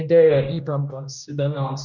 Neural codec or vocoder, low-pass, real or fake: codec, 16 kHz, 1 kbps, X-Codec, HuBERT features, trained on general audio; 7.2 kHz; fake